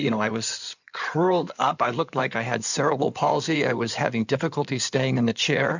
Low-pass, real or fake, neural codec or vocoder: 7.2 kHz; fake; codec, 16 kHz in and 24 kHz out, 2.2 kbps, FireRedTTS-2 codec